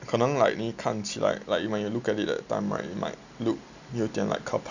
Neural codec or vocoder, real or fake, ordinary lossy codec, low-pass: none; real; none; 7.2 kHz